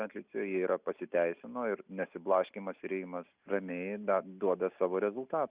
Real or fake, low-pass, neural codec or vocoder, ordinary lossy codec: real; 3.6 kHz; none; Opus, 64 kbps